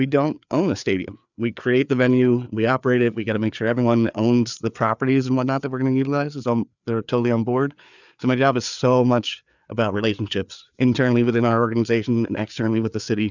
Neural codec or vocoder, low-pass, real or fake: codec, 16 kHz, 4 kbps, FreqCodec, larger model; 7.2 kHz; fake